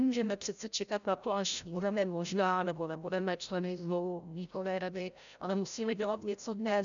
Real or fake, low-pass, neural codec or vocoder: fake; 7.2 kHz; codec, 16 kHz, 0.5 kbps, FreqCodec, larger model